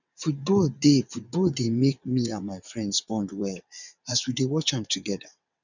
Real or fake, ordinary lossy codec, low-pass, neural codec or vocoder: fake; none; 7.2 kHz; vocoder, 22.05 kHz, 80 mel bands, WaveNeXt